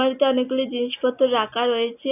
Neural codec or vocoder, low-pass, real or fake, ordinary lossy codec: none; 3.6 kHz; real; none